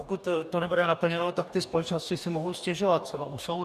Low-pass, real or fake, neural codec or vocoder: 14.4 kHz; fake; codec, 44.1 kHz, 2.6 kbps, DAC